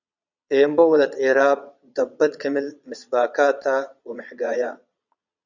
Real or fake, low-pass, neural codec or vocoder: fake; 7.2 kHz; vocoder, 22.05 kHz, 80 mel bands, Vocos